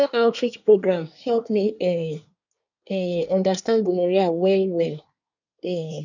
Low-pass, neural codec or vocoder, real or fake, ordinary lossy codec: 7.2 kHz; codec, 24 kHz, 1 kbps, SNAC; fake; none